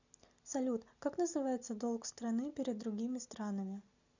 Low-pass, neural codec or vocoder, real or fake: 7.2 kHz; none; real